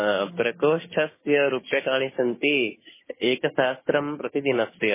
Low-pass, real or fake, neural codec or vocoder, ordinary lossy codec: 3.6 kHz; fake; codec, 16 kHz, 6 kbps, DAC; MP3, 16 kbps